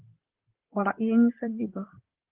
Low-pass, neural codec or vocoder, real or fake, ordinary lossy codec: 3.6 kHz; vocoder, 44.1 kHz, 128 mel bands, Pupu-Vocoder; fake; Opus, 16 kbps